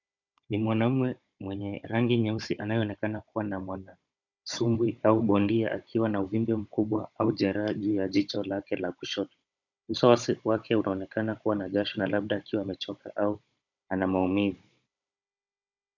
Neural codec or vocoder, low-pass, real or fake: codec, 16 kHz, 16 kbps, FunCodec, trained on Chinese and English, 50 frames a second; 7.2 kHz; fake